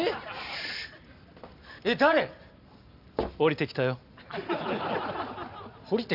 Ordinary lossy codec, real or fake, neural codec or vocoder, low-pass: none; real; none; 5.4 kHz